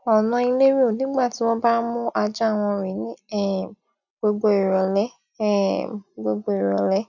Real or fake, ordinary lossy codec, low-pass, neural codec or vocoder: real; none; 7.2 kHz; none